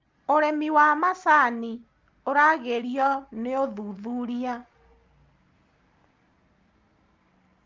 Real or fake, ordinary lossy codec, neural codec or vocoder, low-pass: real; Opus, 32 kbps; none; 7.2 kHz